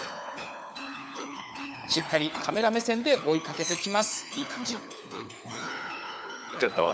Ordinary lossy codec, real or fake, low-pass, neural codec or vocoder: none; fake; none; codec, 16 kHz, 4 kbps, FunCodec, trained on LibriTTS, 50 frames a second